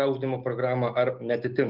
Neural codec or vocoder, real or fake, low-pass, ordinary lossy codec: none; real; 5.4 kHz; Opus, 32 kbps